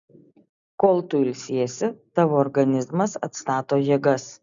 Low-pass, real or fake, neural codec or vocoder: 7.2 kHz; real; none